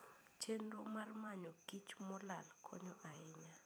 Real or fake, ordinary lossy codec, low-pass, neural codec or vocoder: fake; none; none; vocoder, 44.1 kHz, 128 mel bands every 256 samples, BigVGAN v2